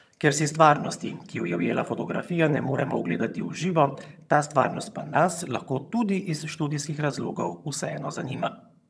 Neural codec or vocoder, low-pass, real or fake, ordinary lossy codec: vocoder, 22.05 kHz, 80 mel bands, HiFi-GAN; none; fake; none